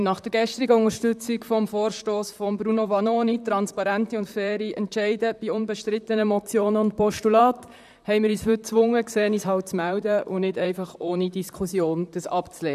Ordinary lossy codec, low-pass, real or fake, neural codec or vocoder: none; 14.4 kHz; fake; vocoder, 44.1 kHz, 128 mel bands, Pupu-Vocoder